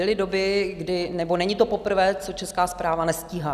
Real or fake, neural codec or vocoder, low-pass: real; none; 14.4 kHz